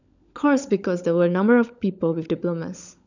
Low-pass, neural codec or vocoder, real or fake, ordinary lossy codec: 7.2 kHz; codec, 16 kHz, 16 kbps, FunCodec, trained on LibriTTS, 50 frames a second; fake; none